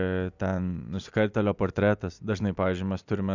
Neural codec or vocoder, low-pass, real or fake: none; 7.2 kHz; real